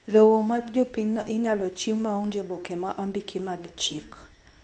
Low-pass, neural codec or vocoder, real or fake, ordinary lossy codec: 10.8 kHz; codec, 24 kHz, 0.9 kbps, WavTokenizer, medium speech release version 2; fake; none